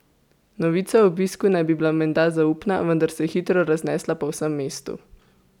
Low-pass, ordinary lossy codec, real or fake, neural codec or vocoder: 19.8 kHz; none; real; none